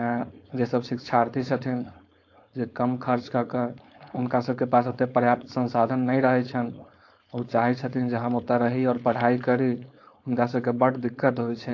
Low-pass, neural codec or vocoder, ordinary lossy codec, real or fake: 7.2 kHz; codec, 16 kHz, 4.8 kbps, FACodec; AAC, 48 kbps; fake